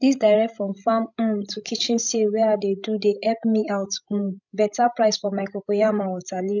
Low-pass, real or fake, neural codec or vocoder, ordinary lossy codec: 7.2 kHz; fake; codec, 16 kHz, 16 kbps, FreqCodec, larger model; none